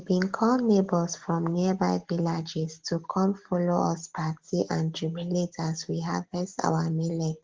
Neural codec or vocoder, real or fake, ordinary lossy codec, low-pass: none; real; Opus, 16 kbps; 7.2 kHz